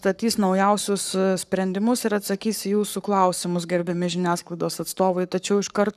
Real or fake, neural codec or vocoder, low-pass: fake; codec, 44.1 kHz, 7.8 kbps, Pupu-Codec; 14.4 kHz